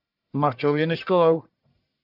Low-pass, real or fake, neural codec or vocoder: 5.4 kHz; fake; codec, 44.1 kHz, 1.7 kbps, Pupu-Codec